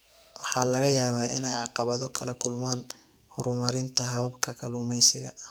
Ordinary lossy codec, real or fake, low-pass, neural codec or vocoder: none; fake; none; codec, 44.1 kHz, 2.6 kbps, SNAC